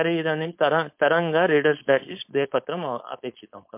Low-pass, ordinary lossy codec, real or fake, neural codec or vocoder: 3.6 kHz; MP3, 32 kbps; fake; codec, 16 kHz, 4.8 kbps, FACodec